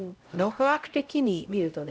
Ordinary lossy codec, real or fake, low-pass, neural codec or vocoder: none; fake; none; codec, 16 kHz, 0.5 kbps, X-Codec, HuBERT features, trained on LibriSpeech